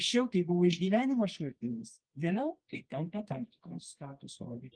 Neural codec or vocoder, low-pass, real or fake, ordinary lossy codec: codec, 24 kHz, 0.9 kbps, WavTokenizer, medium music audio release; 9.9 kHz; fake; Opus, 24 kbps